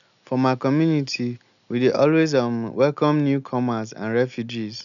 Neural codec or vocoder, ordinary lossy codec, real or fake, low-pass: none; none; real; 7.2 kHz